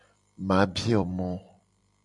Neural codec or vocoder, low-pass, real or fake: vocoder, 44.1 kHz, 128 mel bands every 256 samples, BigVGAN v2; 10.8 kHz; fake